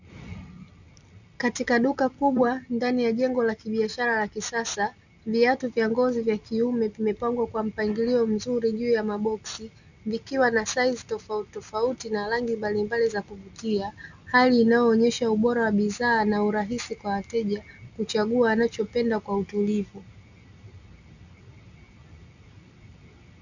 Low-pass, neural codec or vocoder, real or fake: 7.2 kHz; none; real